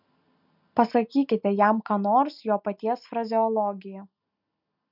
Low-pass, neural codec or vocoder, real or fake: 5.4 kHz; none; real